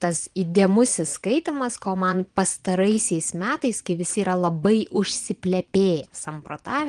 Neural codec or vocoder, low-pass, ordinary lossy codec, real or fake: vocoder, 22.05 kHz, 80 mel bands, Vocos; 9.9 kHz; Opus, 24 kbps; fake